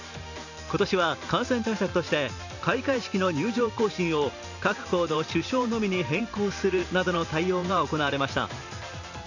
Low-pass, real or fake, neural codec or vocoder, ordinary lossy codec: 7.2 kHz; fake; vocoder, 44.1 kHz, 128 mel bands every 512 samples, BigVGAN v2; none